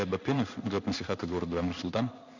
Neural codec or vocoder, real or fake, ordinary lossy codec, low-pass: codec, 16 kHz in and 24 kHz out, 1 kbps, XY-Tokenizer; fake; none; 7.2 kHz